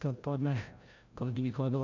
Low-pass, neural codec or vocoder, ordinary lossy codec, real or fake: 7.2 kHz; codec, 16 kHz, 0.5 kbps, FreqCodec, larger model; MP3, 48 kbps; fake